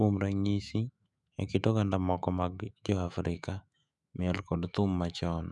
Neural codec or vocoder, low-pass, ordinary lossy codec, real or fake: autoencoder, 48 kHz, 128 numbers a frame, DAC-VAE, trained on Japanese speech; 10.8 kHz; none; fake